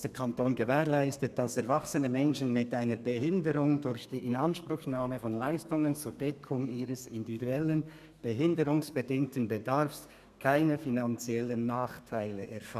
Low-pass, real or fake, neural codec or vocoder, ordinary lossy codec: 14.4 kHz; fake; codec, 32 kHz, 1.9 kbps, SNAC; none